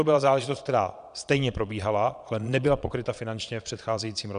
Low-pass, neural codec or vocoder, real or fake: 9.9 kHz; vocoder, 22.05 kHz, 80 mel bands, Vocos; fake